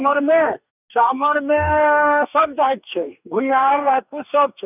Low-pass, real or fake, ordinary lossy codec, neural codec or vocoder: 3.6 kHz; fake; none; codec, 44.1 kHz, 2.6 kbps, DAC